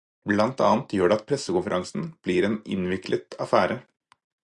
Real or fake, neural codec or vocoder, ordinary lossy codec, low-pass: real; none; Opus, 64 kbps; 10.8 kHz